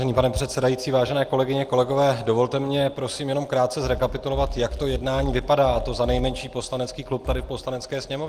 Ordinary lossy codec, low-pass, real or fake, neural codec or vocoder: Opus, 24 kbps; 14.4 kHz; real; none